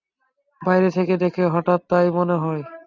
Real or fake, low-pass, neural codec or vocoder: real; 7.2 kHz; none